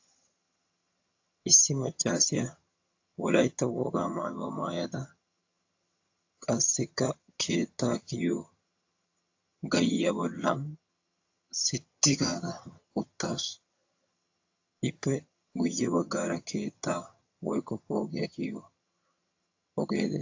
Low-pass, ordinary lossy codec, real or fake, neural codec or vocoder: 7.2 kHz; AAC, 48 kbps; fake; vocoder, 22.05 kHz, 80 mel bands, HiFi-GAN